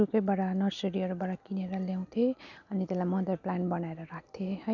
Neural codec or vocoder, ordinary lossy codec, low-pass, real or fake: none; none; 7.2 kHz; real